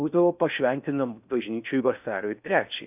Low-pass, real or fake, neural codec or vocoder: 3.6 kHz; fake; codec, 16 kHz in and 24 kHz out, 0.6 kbps, FocalCodec, streaming, 4096 codes